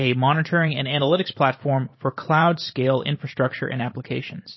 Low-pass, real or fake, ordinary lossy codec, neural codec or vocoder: 7.2 kHz; fake; MP3, 24 kbps; vocoder, 44.1 kHz, 128 mel bands every 512 samples, BigVGAN v2